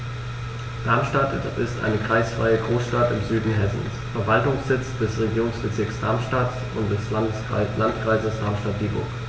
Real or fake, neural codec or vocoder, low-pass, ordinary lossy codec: real; none; none; none